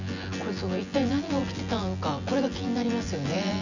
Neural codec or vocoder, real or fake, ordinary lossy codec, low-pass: vocoder, 24 kHz, 100 mel bands, Vocos; fake; none; 7.2 kHz